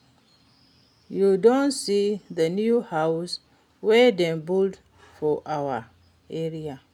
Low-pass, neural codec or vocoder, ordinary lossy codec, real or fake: 19.8 kHz; none; none; real